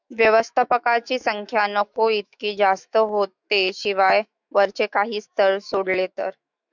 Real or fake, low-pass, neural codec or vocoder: fake; 7.2 kHz; autoencoder, 48 kHz, 128 numbers a frame, DAC-VAE, trained on Japanese speech